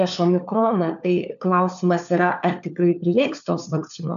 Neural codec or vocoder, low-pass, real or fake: codec, 16 kHz, 4 kbps, FunCodec, trained on LibriTTS, 50 frames a second; 7.2 kHz; fake